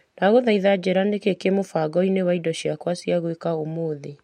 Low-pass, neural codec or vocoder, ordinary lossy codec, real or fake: 19.8 kHz; none; MP3, 64 kbps; real